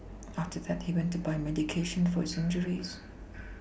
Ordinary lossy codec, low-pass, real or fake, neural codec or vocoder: none; none; real; none